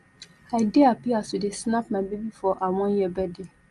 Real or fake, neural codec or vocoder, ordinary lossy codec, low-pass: real; none; Opus, 32 kbps; 10.8 kHz